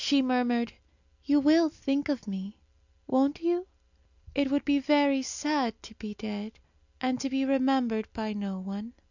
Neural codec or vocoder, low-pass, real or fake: none; 7.2 kHz; real